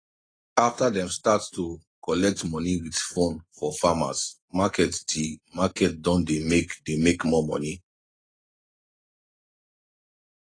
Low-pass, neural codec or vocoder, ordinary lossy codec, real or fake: 9.9 kHz; none; AAC, 32 kbps; real